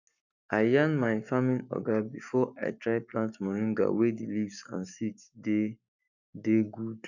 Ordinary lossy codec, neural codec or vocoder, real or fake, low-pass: none; codec, 44.1 kHz, 7.8 kbps, Pupu-Codec; fake; 7.2 kHz